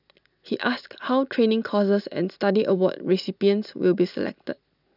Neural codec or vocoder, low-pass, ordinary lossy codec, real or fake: none; 5.4 kHz; none; real